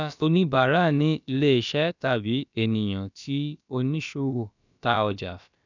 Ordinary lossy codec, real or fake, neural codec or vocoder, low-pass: none; fake; codec, 16 kHz, about 1 kbps, DyCAST, with the encoder's durations; 7.2 kHz